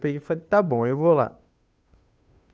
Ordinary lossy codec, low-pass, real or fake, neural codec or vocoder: none; none; fake; codec, 16 kHz, 2 kbps, FunCodec, trained on Chinese and English, 25 frames a second